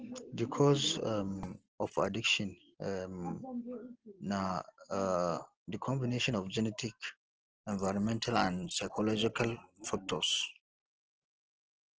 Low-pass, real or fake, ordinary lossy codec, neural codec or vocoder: 7.2 kHz; real; Opus, 16 kbps; none